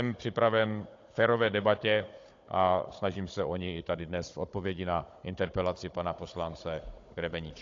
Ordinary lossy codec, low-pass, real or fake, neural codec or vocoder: AAC, 48 kbps; 7.2 kHz; fake; codec, 16 kHz, 16 kbps, FunCodec, trained on Chinese and English, 50 frames a second